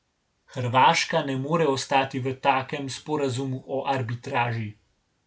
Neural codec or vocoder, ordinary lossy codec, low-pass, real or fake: none; none; none; real